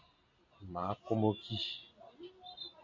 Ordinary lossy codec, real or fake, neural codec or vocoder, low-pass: AAC, 48 kbps; real; none; 7.2 kHz